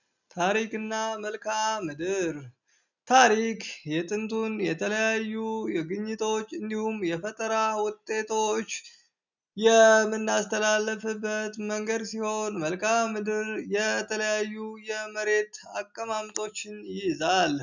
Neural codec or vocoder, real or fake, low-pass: none; real; 7.2 kHz